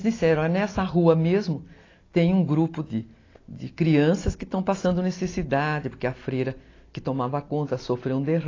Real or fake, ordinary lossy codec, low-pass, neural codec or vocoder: real; AAC, 32 kbps; 7.2 kHz; none